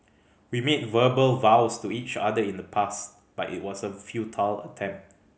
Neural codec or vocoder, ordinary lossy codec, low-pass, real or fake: none; none; none; real